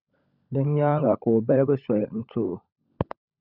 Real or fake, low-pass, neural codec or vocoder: fake; 5.4 kHz; codec, 16 kHz, 16 kbps, FunCodec, trained on LibriTTS, 50 frames a second